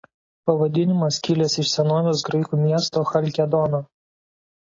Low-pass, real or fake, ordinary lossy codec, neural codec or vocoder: 7.2 kHz; real; AAC, 32 kbps; none